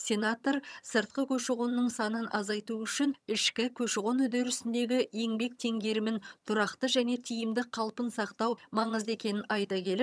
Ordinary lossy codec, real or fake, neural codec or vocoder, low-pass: none; fake; vocoder, 22.05 kHz, 80 mel bands, HiFi-GAN; none